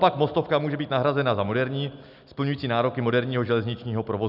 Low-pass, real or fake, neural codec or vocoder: 5.4 kHz; real; none